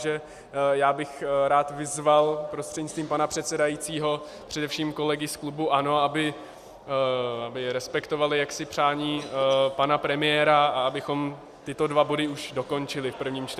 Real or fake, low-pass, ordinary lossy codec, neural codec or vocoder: real; 14.4 kHz; Opus, 64 kbps; none